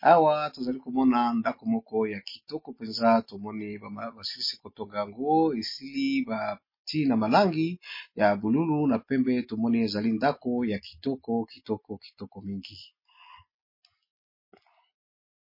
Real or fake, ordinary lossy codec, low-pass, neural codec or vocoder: real; MP3, 24 kbps; 5.4 kHz; none